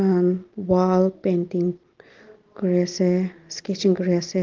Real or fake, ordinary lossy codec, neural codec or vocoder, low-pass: real; Opus, 16 kbps; none; 7.2 kHz